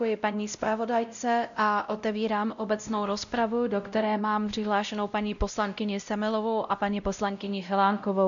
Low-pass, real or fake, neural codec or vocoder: 7.2 kHz; fake; codec, 16 kHz, 0.5 kbps, X-Codec, WavLM features, trained on Multilingual LibriSpeech